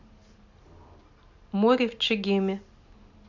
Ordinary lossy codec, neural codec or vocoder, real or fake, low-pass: none; none; real; 7.2 kHz